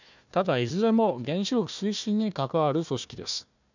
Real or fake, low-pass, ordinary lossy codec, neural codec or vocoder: fake; 7.2 kHz; none; codec, 16 kHz, 1 kbps, FunCodec, trained on Chinese and English, 50 frames a second